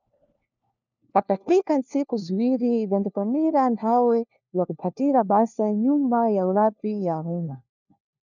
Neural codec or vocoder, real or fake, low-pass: codec, 16 kHz, 1 kbps, FunCodec, trained on LibriTTS, 50 frames a second; fake; 7.2 kHz